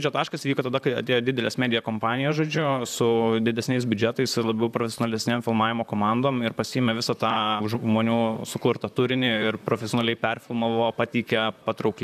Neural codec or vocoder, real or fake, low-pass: vocoder, 44.1 kHz, 128 mel bands, Pupu-Vocoder; fake; 14.4 kHz